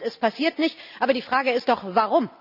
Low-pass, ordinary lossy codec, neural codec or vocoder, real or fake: 5.4 kHz; none; none; real